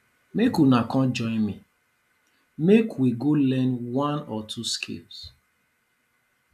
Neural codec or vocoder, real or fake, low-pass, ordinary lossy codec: none; real; 14.4 kHz; none